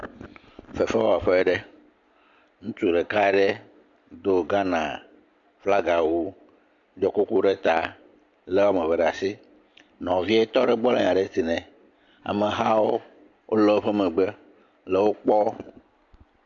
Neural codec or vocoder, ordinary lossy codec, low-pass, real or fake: none; AAC, 64 kbps; 7.2 kHz; real